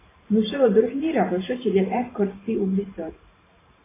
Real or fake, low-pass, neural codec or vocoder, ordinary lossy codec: fake; 3.6 kHz; vocoder, 44.1 kHz, 128 mel bands every 512 samples, BigVGAN v2; AAC, 16 kbps